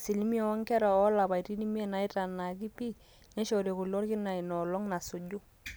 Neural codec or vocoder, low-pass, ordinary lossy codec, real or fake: none; none; none; real